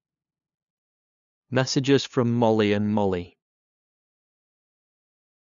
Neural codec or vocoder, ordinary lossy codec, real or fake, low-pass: codec, 16 kHz, 2 kbps, FunCodec, trained on LibriTTS, 25 frames a second; none; fake; 7.2 kHz